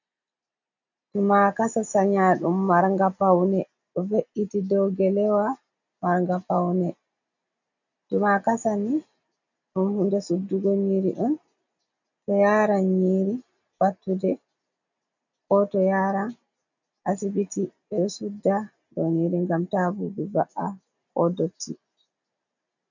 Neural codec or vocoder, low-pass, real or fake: none; 7.2 kHz; real